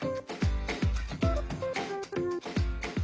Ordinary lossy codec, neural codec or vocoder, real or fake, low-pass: none; none; real; none